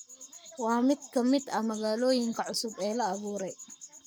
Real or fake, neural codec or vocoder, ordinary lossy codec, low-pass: fake; codec, 44.1 kHz, 7.8 kbps, Pupu-Codec; none; none